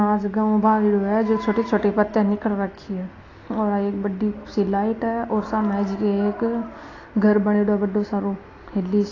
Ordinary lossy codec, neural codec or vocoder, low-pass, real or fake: AAC, 32 kbps; none; 7.2 kHz; real